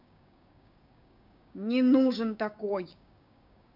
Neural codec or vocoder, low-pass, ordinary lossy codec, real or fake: codec, 16 kHz, 6 kbps, DAC; 5.4 kHz; none; fake